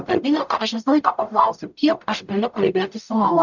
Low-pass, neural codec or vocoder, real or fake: 7.2 kHz; codec, 44.1 kHz, 0.9 kbps, DAC; fake